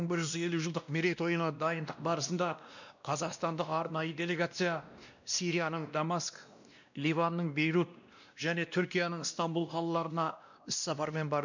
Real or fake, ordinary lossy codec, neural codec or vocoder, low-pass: fake; none; codec, 16 kHz, 1 kbps, X-Codec, WavLM features, trained on Multilingual LibriSpeech; 7.2 kHz